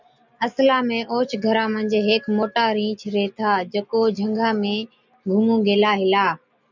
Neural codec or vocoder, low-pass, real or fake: none; 7.2 kHz; real